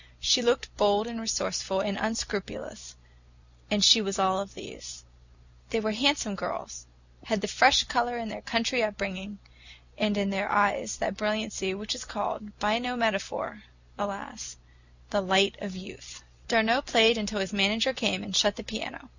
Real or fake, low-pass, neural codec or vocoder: real; 7.2 kHz; none